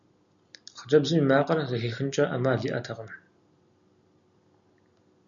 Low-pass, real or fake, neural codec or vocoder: 7.2 kHz; real; none